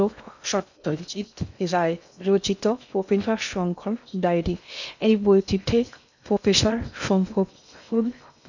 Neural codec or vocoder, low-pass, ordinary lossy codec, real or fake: codec, 16 kHz in and 24 kHz out, 0.6 kbps, FocalCodec, streaming, 2048 codes; 7.2 kHz; none; fake